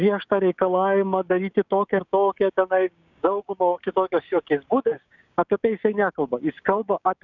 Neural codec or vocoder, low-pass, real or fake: codec, 16 kHz, 6 kbps, DAC; 7.2 kHz; fake